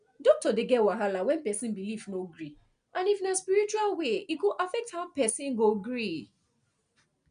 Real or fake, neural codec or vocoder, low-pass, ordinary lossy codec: real; none; 9.9 kHz; none